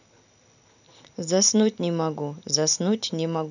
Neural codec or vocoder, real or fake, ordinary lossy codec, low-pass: none; real; none; 7.2 kHz